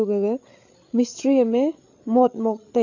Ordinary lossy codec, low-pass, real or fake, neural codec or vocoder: AAC, 48 kbps; 7.2 kHz; fake; codec, 16 kHz, 8 kbps, FreqCodec, larger model